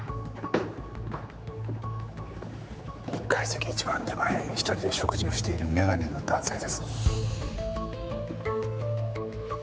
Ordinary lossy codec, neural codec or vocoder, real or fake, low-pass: none; codec, 16 kHz, 4 kbps, X-Codec, HuBERT features, trained on general audio; fake; none